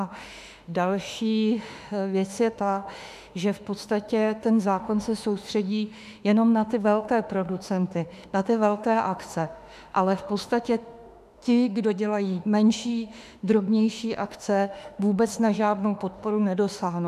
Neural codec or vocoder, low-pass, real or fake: autoencoder, 48 kHz, 32 numbers a frame, DAC-VAE, trained on Japanese speech; 14.4 kHz; fake